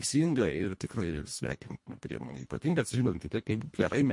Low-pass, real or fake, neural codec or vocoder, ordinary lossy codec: 10.8 kHz; fake; codec, 24 kHz, 1.5 kbps, HILCodec; MP3, 48 kbps